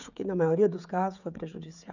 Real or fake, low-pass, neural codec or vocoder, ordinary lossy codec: fake; 7.2 kHz; codec, 16 kHz, 16 kbps, FreqCodec, smaller model; none